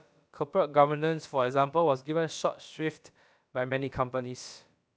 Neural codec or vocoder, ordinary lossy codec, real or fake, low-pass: codec, 16 kHz, about 1 kbps, DyCAST, with the encoder's durations; none; fake; none